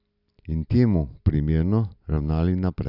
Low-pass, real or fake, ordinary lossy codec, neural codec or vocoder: 5.4 kHz; real; none; none